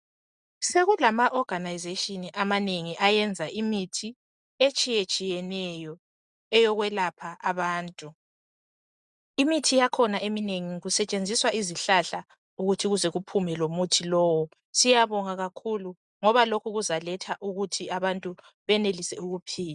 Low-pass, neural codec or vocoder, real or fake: 10.8 kHz; none; real